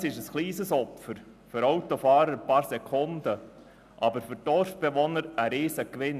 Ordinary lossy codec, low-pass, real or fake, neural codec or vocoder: none; 14.4 kHz; real; none